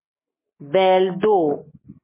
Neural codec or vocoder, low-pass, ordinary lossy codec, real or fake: none; 3.6 kHz; MP3, 16 kbps; real